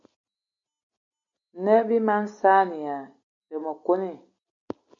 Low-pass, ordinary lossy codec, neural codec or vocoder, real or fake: 7.2 kHz; MP3, 64 kbps; none; real